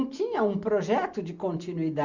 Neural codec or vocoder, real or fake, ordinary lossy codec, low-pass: none; real; Opus, 64 kbps; 7.2 kHz